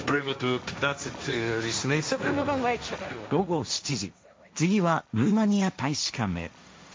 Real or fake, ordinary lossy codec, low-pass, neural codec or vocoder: fake; none; none; codec, 16 kHz, 1.1 kbps, Voila-Tokenizer